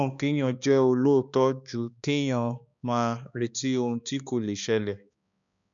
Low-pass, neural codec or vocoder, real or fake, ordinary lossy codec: 7.2 kHz; codec, 16 kHz, 2 kbps, X-Codec, HuBERT features, trained on balanced general audio; fake; none